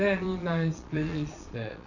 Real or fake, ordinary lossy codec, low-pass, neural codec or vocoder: fake; none; 7.2 kHz; vocoder, 22.05 kHz, 80 mel bands, Vocos